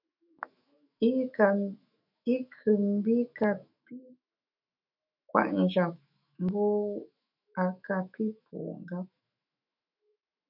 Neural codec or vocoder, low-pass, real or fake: autoencoder, 48 kHz, 128 numbers a frame, DAC-VAE, trained on Japanese speech; 5.4 kHz; fake